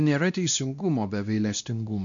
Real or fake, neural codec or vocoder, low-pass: fake; codec, 16 kHz, 1 kbps, X-Codec, WavLM features, trained on Multilingual LibriSpeech; 7.2 kHz